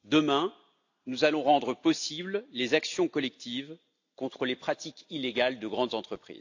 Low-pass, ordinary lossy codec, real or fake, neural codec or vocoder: 7.2 kHz; AAC, 48 kbps; real; none